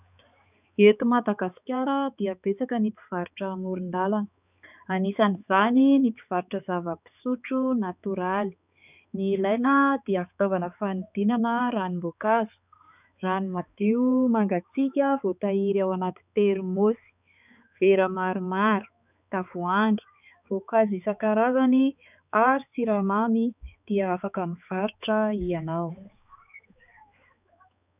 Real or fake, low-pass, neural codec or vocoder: fake; 3.6 kHz; codec, 16 kHz, 4 kbps, X-Codec, HuBERT features, trained on balanced general audio